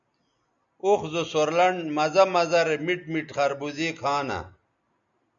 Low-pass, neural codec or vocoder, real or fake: 7.2 kHz; none; real